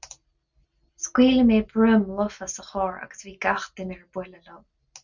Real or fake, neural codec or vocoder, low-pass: real; none; 7.2 kHz